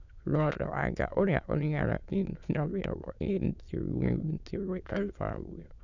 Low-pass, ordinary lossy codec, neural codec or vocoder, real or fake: 7.2 kHz; none; autoencoder, 22.05 kHz, a latent of 192 numbers a frame, VITS, trained on many speakers; fake